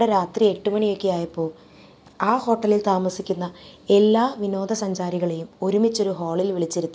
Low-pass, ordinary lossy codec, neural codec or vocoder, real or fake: none; none; none; real